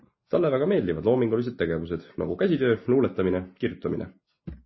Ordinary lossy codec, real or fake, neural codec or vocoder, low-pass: MP3, 24 kbps; real; none; 7.2 kHz